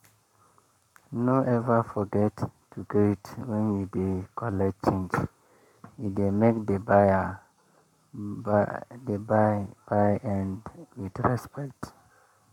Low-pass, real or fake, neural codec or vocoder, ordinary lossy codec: 19.8 kHz; fake; codec, 44.1 kHz, 7.8 kbps, Pupu-Codec; none